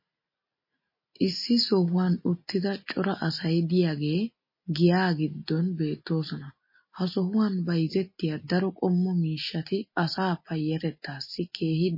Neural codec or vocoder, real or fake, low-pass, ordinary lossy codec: none; real; 5.4 kHz; MP3, 24 kbps